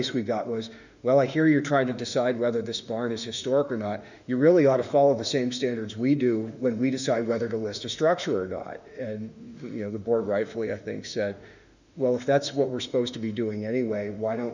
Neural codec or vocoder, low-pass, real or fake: autoencoder, 48 kHz, 32 numbers a frame, DAC-VAE, trained on Japanese speech; 7.2 kHz; fake